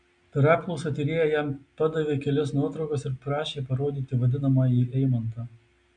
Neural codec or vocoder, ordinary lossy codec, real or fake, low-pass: none; MP3, 96 kbps; real; 9.9 kHz